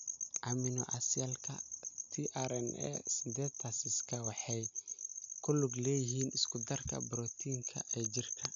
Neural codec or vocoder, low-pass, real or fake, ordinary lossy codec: none; 7.2 kHz; real; none